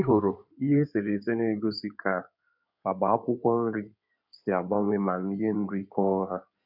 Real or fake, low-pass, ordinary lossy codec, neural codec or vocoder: fake; 5.4 kHz; none; codec, 16 kHz in and 24 kHz out, 2.2 kbps, FireRedTTS-2 codec